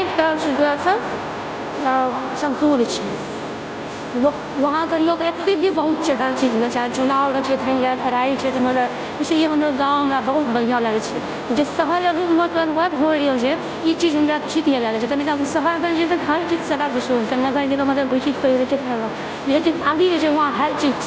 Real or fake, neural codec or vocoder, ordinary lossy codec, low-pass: fake; codec, 16 kHz, 0.5 kbps, FunCodec, trained on Chinese and English, 25 frames a second; none; none